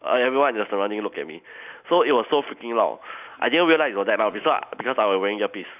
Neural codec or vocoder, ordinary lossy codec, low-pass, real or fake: none; none; 3.6 kHz; real